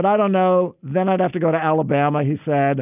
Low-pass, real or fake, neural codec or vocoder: 3.6 kHz; real; none